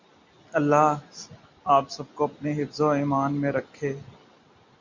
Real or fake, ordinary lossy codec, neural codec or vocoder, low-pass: real; MP3, 48 kbps; none; 7.2 kHz